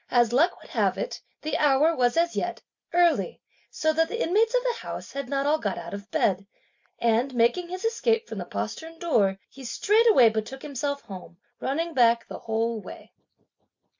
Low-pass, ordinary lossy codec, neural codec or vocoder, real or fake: 7.2 kHz; MP3, 64 kbps; none; real